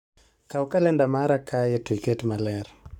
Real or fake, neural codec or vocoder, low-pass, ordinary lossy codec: fake; codec, 44.1 kHz, 7.8 kbps, Pupu-Codec; 19.8 kHz; none